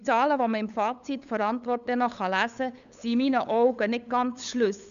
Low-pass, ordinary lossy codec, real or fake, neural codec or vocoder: 7.2 kHz; none; fake; codec, 16 kHz, 8 kbps, FunCodec, trained on LibriTTS, 25 frames a second